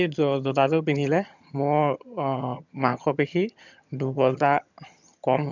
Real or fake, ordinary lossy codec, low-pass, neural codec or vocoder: fake; none; 7.2 kHz; vocoder, 22.05 kHz, 80 mel bands, HiFi-GAN